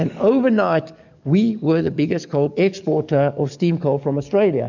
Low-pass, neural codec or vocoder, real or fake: 7.2 kHz; codec, 44.1 kHz, 7.8 kbps, DAC; fake